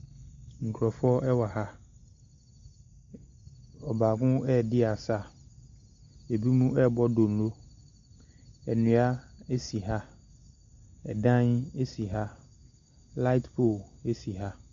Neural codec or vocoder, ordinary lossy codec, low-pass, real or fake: none; Opus, 64 kbps; 7.2 kHz; real